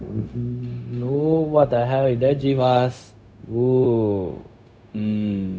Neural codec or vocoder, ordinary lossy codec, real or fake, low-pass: codec, 16 kHz, 0.4 kbps, LongCat-Audio-Codec; none; fake; none